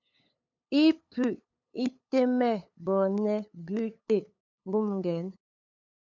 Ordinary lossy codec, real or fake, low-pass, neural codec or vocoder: MP3, 64 kbps; fake; 7.2 kHz; codec, 16 kHz, 8 kbps, FunCodec, trained on LibriTTS, 25 frames a second